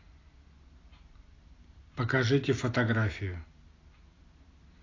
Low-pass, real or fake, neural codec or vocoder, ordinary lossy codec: 7.2 kHz; real; none; AAC, 32 kbps